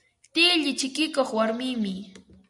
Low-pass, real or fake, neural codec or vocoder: 10.8 kHz; real; none